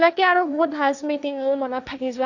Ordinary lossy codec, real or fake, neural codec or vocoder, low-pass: none; fake; codec, 16 kHz, 1.1 kbps, Voila-Tokenizer; 7.2 kHz